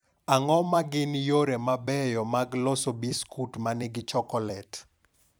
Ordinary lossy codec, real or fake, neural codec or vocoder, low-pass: none; fake; vocoder, 44.1 kHz, 128 mel bands every 256 samples, BigVGAN v2; none